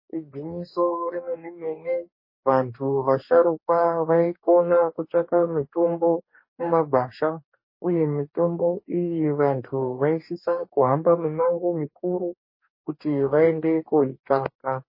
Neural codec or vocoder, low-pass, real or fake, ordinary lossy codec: codec, 44.1 kHz, 2.6 kbps, DAC; 5.4 kHz; fake; MP3, 24 kbps